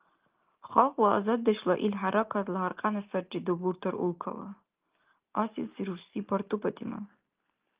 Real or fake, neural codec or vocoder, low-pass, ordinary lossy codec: real; none; 3.6 kHz; Opus, 32 kbps